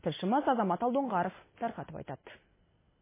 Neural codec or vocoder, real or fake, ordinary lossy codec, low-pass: none; real; MP3, 16 kbps; 3.6 kHz